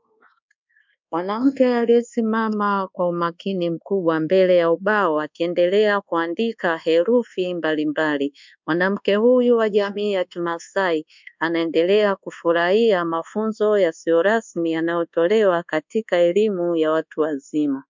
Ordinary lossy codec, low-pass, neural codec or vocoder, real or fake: MP3, 64 kbps; 7.2 kHz; codec, 24 kHz, 1.2 kbps, DualCodec; fake